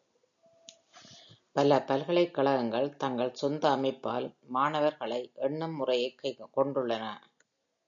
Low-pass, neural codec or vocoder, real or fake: 7.2 kHz; none; real